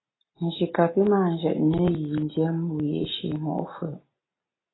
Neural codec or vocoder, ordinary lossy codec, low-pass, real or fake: none; AAC, 16 kbps; 7.2 kHz; real